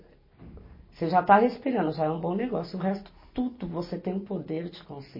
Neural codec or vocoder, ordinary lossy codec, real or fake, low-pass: none; MP3, 24 kbps; real; 5.4 kHz